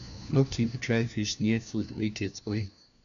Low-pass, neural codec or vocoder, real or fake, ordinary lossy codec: 7.2 kHz; codec, 16 kHz, 1 kbps, FunCodec, trained on LibriTTS, 50 frames a second; fake; none